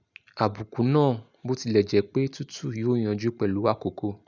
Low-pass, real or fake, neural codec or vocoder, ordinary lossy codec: 7.2 kHz; real; none; none